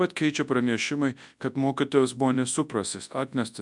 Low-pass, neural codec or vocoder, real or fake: 10.8 kHz; codec, 24 kHz, 0.9 kbps, WavTokenizer, large speech release; fake